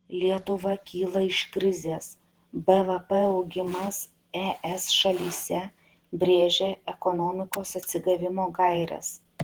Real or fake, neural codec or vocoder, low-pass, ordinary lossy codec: fake; vocoder, 48 kHz, 128 mel bands, Vocos; 19.8 kHz; Opus, 16 kbps